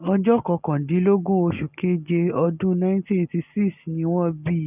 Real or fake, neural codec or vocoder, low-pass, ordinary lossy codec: real; none; 3.6 kHz; none